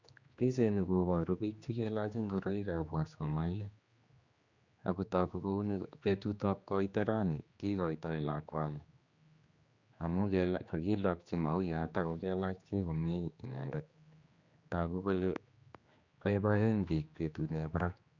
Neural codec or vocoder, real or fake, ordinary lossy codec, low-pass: codec, 16 kHz, 2 kbps, X-Codec, HuBERT features, trained on general audio; fake; Opus, 64 kbps; 7.2 kHz